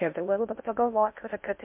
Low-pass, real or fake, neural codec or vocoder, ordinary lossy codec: 3.6 kHz; fake; codec, 16 kHz in and 24 kHz out, 0.6 kbps, FocalCodec, streaming, 4096 codes; MP3, 32 kbps